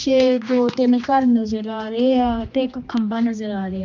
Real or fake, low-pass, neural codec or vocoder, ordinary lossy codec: fake; 7.2 kHz; codec, 16 kHz, 2 kbps, X-Codec, HuBERT features, trained on general audio; MP3, 64 kbps